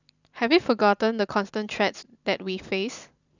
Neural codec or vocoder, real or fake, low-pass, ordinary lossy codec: vocoder, 44.1 kHz, 128 mel bands every 512 samples, BigVGAN v2; fake; 7.2 kHz; none